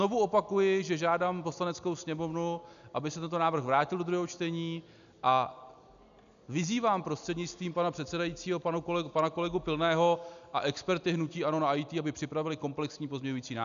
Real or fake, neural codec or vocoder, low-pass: real; none; 7.2 kHz